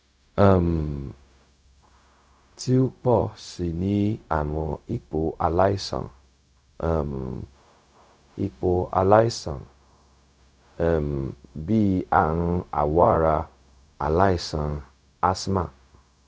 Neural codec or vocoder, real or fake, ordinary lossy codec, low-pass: codec, 16 kHz, 0.4 kbps, LongCat-Audio-Codec; fake; none; none